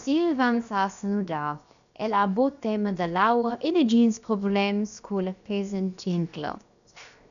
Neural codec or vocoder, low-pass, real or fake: codec, 16 kHz, 0.7 kbps, FocalCodec; 7.2 kHz; fake